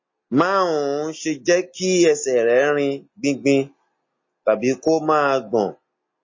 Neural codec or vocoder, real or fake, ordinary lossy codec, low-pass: none; real; MP3, 32 kbps; 7.2 kHz